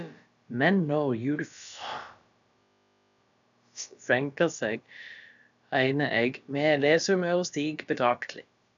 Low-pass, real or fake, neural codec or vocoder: 7.2 kHz; fake; codec, 16 kHz, about 1 kbps, DyCAST, with the encoder's durations